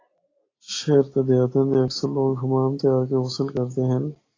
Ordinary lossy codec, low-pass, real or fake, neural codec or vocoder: AAC, 32 kbps; 7.2 kHz; real; none